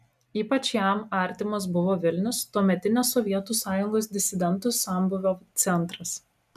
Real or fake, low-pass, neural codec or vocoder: real; 14.4 kHz; none